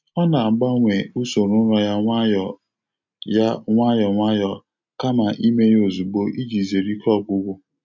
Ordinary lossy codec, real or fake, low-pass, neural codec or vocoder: MP3, 64 kbps; real; 7.2 kHz; none